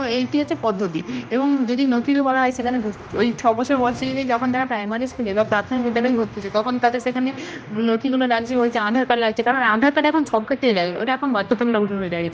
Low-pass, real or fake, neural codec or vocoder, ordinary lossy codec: none; fake; codec, 16 kHz, 1 kbps, X-Codec, HuBERT features, trained on general audio; none